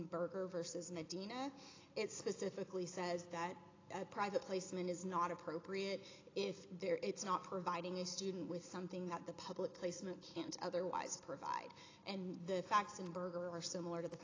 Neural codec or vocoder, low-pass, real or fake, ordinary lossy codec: none; 7.2 kHz; real; AAC, 32 kbps